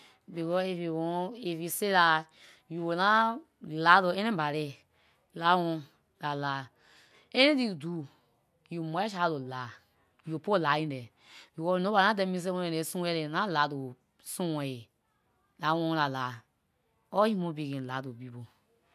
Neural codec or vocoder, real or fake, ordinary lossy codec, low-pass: none; real; none; 14.4 kHz